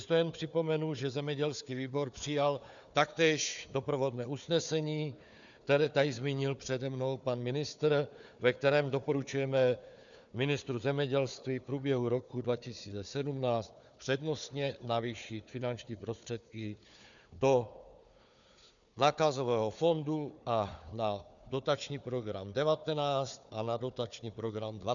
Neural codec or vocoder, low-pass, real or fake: codec, 16 kHz, 4 kbps, FunCodec, trained on Chinese and English, 50 frames a second; 7.2 kHz; fake